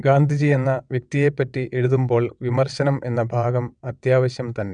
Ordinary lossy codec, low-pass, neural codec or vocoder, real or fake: none; 9.9 kHz; vocoder, 22.05 kHz, 80 mel bands, WaveNeXt; fake